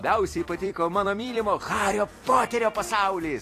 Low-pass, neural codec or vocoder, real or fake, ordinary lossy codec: 14.4 kHz; vocoder, 44.1 kHz, 128 mel bands every 256 samples, BigVGAN v2; fake; AAC, 48 kbps